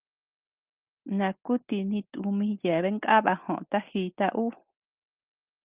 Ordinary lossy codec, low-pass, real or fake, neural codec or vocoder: Opus, 24 kbps; 3.6 kHz; real; none